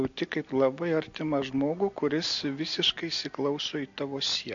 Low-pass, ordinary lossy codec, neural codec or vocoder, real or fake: 7.2 kHz; MP3, 48 kbps; none; real